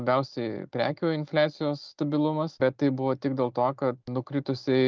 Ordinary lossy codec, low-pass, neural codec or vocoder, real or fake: Opus, 24 kbps; 7.2 kHz; none; real